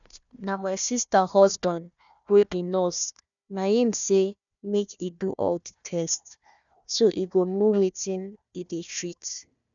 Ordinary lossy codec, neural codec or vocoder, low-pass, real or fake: none; codec, 16 kHz, 1 kbps, FunCodec, trained on Chinese and English, 50 frames a second; 7.2 kHz; fake